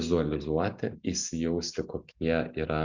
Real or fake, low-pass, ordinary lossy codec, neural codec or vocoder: real; 7.2 kHz; Opus, 64 kbps; none